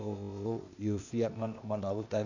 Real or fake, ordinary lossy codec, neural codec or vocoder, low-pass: fake; none; codec, 16 kHz, 0.8 kbps, ZipCodec; 7.2 kHz